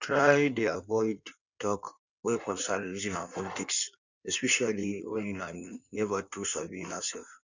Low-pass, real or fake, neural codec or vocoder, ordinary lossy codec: 7.2 kHz; fake; codec, 16 kHz in and 24 kHz out, 1.1 kbps, FireRedTTS-2 codec; none